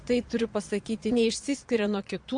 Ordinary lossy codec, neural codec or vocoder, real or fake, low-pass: AAC, 64 kbps; vocoder, 22.05 kHz, 80 mel bands, Vocos; fake; 9.9 kHz